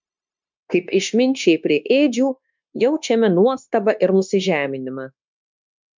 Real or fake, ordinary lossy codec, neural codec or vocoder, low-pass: fake; MP3, 64 kbps; codec, 16 kHz, 0.9 kbps, LongCat-Audio-Codec; 7.2 kHz